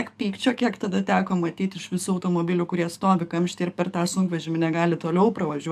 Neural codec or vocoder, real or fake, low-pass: codec, 44.1 kHz, 7.8 kbps, DAC; fake; 14.4 kHz